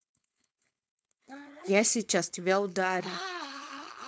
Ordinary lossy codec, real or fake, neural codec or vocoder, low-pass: none; fake; codec, 16 kHz, 4.8 kbps, FACodec; none